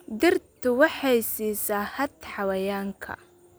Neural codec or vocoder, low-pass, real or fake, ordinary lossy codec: none; none; real; none